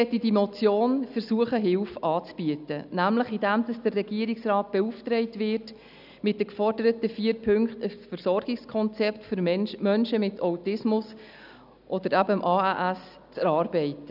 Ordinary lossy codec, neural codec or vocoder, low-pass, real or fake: none; none; 5.4 kHz; real